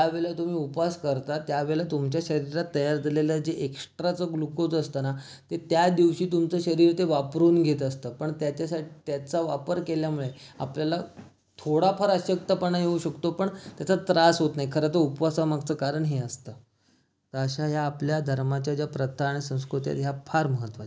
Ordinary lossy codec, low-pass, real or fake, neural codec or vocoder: none; none; real; none